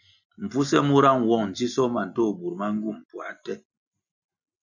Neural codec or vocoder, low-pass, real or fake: none; 7.2 kHz; real